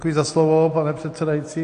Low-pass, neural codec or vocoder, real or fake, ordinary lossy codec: 9.9 kHz; none; real; AAC, 48 kbps